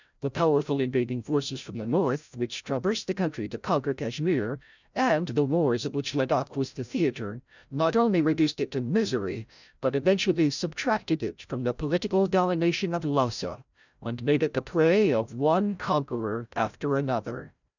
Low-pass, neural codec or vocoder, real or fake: 7.2 kHz; codec, 16 kHz, 0.5 kbps, FreqCodec, larger model; fake